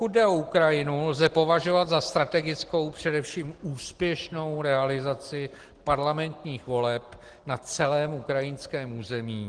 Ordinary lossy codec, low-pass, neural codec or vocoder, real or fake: Opus, 16 kbps; 9.9 kHz; none; real